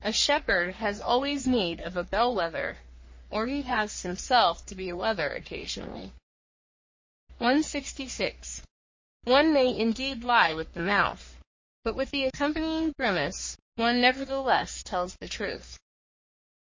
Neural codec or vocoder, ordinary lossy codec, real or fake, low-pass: codec, 44.1 kHz, 3.4 kbps, Pupu-Codec; MP3, 32 kbps; fake; 7.2 kHz